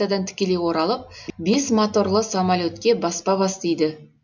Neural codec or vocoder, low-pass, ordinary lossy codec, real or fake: none; 7.2 kHz; none; real